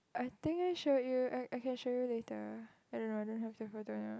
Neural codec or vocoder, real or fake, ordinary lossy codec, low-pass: none; real; none; none